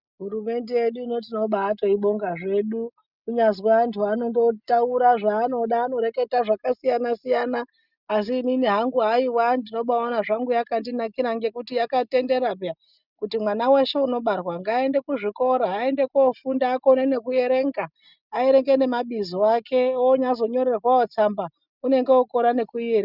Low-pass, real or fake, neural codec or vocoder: 5.4 kHz; real; none